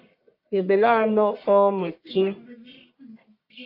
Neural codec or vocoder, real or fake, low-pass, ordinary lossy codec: codec, 44.1 kHz, 1.7 kbps, Pupu-Codec; fake; 5.4 kHz; AAC, 32 kbps